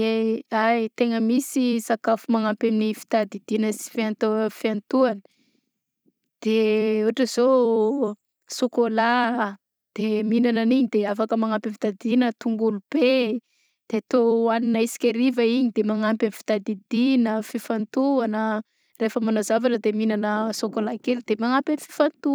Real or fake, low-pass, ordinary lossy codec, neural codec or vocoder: fake; none; none; vocoder, 44.1 kHz, 128 mel bands every 256 samples, BigVGAN v2